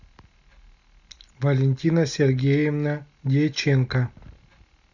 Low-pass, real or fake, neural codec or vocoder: 7.2 kHz; real; none